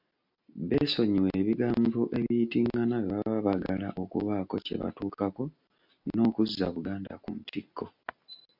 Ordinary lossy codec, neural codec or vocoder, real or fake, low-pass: MP3, 48 kbps; none; real; 5.4 kHz